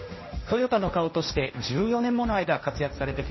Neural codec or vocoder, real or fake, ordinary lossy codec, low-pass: codec, 16 kHz, 1.1 kbps, Voila-Tokenizer; fake; MP3, 24 kbps; 7.2 kHz